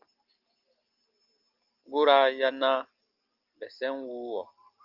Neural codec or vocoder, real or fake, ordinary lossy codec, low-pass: none; real; Opus, 32 kbps; 5.4 kHz